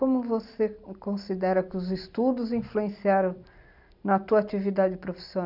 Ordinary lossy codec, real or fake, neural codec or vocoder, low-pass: none; real; none; 5.4 kHz